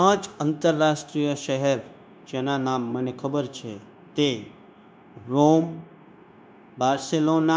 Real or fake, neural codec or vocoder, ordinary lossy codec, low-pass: fake; codec, 16 kHz, 0.9 kbps, LongCat-Audio-Codec; none; none